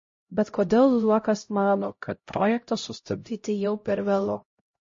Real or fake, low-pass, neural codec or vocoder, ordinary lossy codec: fake; 7.2 kHz; codec, 16 kHz, 0.5 kbps, X-Codec, HuBERT features, trained on LibriSpeech; MP3, 32 kbps